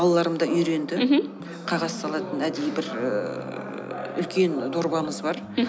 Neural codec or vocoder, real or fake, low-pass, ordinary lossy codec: none; real; none; none